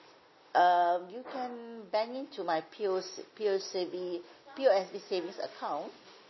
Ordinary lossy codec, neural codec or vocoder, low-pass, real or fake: MP3, 24 kbps; none; 7.2 kHz; real